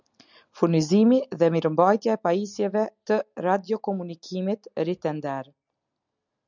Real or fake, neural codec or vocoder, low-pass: real; none; 7.2 kHz